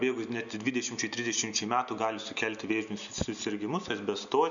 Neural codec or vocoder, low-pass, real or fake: none; 7.2 kHz; real